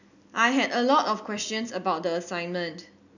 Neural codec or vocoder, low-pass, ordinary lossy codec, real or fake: none; 7.2 kHz; none; real